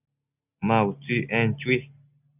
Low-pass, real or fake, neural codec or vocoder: 3.6 kHz; real; none